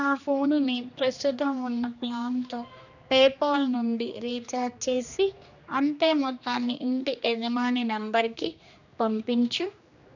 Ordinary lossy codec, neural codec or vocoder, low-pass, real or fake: none; codec, 16 kHz, 2 kbps, X-Codec, HuBERT features, trained on general audio; 7.2 kHz; fake